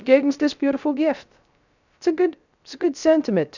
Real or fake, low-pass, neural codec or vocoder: fake; 7.2 kHz; codec, 16 kHz, 0.3 kbps, FocalCodec